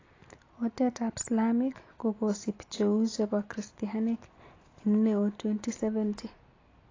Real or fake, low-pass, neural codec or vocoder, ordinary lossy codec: real; 7.2 kHz; none; AAC, 32 kbps